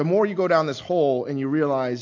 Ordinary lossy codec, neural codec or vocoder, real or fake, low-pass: AAC, 48 kbps; none; real; 7.2 kHz